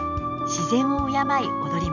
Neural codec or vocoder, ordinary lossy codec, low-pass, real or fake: none; none; 7.2 kHz; real